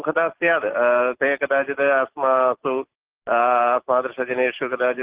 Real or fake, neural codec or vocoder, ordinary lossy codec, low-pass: real; none; Opus, 16 kbps; 3.6 kHz